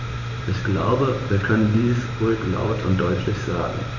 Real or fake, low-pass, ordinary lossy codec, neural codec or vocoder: fake; 7.2 kHz; none; vocoder, 44.1 kHz, 128 mel bands every 512 samples, BigVGAN v2